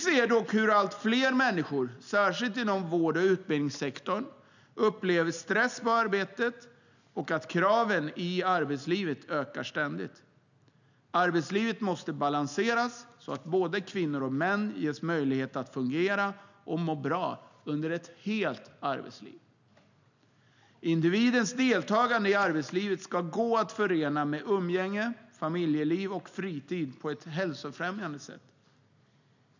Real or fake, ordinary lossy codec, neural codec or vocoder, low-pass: real; none; none; 7.2 kHz